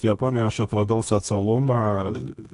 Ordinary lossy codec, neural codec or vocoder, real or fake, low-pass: AAC, 64 kbps; codec, 24 kHz, 0.9 kbps, WavTokenizer, medium music audio release; fake; 10.8 kHz